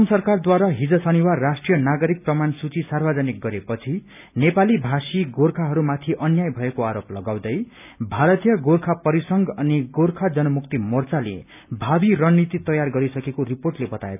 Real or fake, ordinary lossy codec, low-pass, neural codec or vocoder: real; none; 3.6 kHz; none